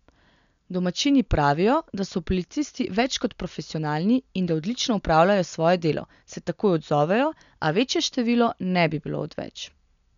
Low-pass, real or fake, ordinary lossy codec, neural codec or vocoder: 7.2 kHz; real; none; none